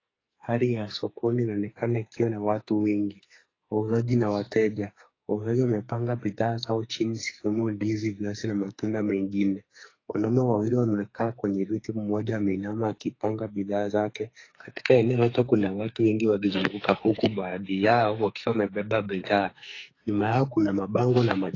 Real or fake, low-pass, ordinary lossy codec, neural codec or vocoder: fake; 7.2 kHz; AAC, 32 kbps; codec, 44.1 kHz, 2.6 kbps, SNAC